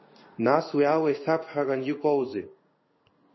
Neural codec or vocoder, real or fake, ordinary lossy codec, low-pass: codec, 16 kHz in and 24 kHz out, 1 kbps, XY-Tokenizer; fake; MP3, 24 kbps; 7.2 kHz